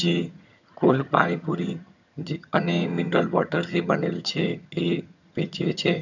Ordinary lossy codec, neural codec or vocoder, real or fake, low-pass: none; vocoder, 22.05 kHz, 80 mel bands, HiFi-GAN; fake; 7.2 kHz